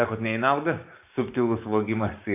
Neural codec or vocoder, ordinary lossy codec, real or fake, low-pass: codec, 16 kHz, 6 kbps, DAC; AAC, 32 kbps; fake; 3.6 kHz